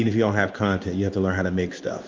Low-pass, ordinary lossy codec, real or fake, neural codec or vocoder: 7.2 kHz; Opus, 32 kbps; fake; codec, 16 kHz in and 24 kHz out, 1 kbps, XY-Tokenizer